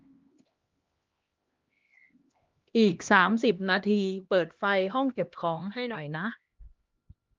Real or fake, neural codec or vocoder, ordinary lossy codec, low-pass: fake; codec, 16 kHz, 1 kbps, X-Codec, HuBERT features, trained on LibriSpeech; Opus, 32 kbps; 7.2 kHz